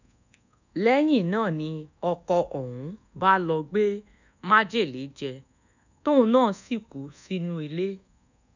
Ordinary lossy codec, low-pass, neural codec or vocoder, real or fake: none; 7.2 kHz; codec, 24 kHz, 1.2 kbps, DualCodec; fake